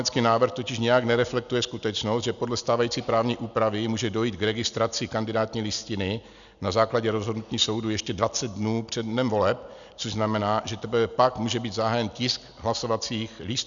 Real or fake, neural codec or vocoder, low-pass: real; none; 7.2 kHz